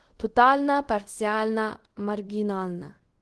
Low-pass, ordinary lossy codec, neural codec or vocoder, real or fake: 10.8 kHz; Opus, 16 kbps; codec, 24 kHz, 0.5 kbps, DualCodec; fake